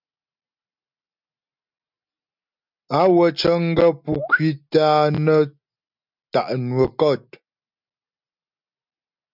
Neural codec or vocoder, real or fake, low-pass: none; real; 5.4 kHz